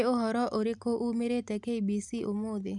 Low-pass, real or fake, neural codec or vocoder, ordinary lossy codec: 10.8 kHz; real; none; none